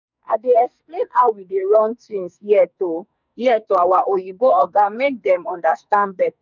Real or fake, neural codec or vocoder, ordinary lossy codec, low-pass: fake; codec, 44.1 kHz, 2.6 kbps, SNAC; none; 7.2 kHz